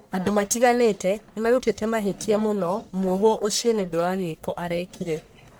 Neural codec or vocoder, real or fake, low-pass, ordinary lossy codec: codec, 44.1 kHz, 1.7 kbps, Pupu-Codec; fake; none; none